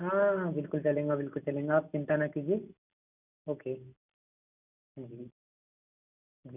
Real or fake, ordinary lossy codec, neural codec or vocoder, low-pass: real; none; none; 3.6 kHz